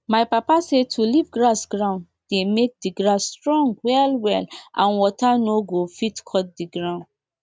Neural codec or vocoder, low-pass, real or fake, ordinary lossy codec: none; none; real; none